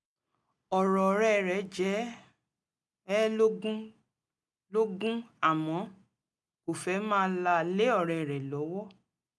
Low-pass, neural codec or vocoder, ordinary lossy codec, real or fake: none; none; none; real